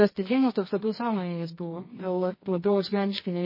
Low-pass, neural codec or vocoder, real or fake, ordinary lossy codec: 5.4 kHz; codec, 24 kHz, 0.9 kbps, WavTokenizer, medium music audio release; fake; MP3, 24 kbps